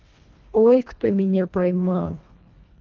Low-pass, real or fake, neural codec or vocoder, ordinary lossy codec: 7.2 kHz; fake; codec, 24 kHz, 1.5 kbps, HILCodec; Opus, 32 kbps